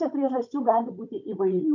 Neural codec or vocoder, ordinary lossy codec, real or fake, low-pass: codec, 16 kHz, 16 kbps, FunCodec, trained on Chinese and English, 50 frames a second; MP3, 48 kbps; fake; 7.2 kHz